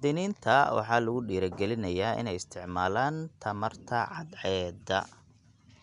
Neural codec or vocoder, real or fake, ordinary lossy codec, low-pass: none; real; none; 10.8 kHz